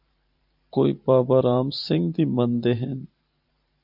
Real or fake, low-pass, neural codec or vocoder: fake; 5.4 kHz; vocoder, 44.1 kHz, 128 mel bands every 512 samples, BigVGAN v2